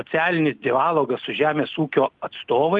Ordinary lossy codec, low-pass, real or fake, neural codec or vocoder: Opus, 32 kbps; 10.8 kHz; real; none